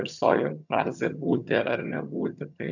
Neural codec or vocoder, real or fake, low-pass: vocoder, 22.05 kHz, 80 mel bands, HiFi-GAN; fake; 7.2 kHz